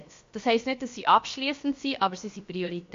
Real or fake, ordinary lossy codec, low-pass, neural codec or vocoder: fake; MP3, 48 kbps; 7.2 kHz; codec, 16 kHz, about 1 kbps, DyCAST, with the encoder's durations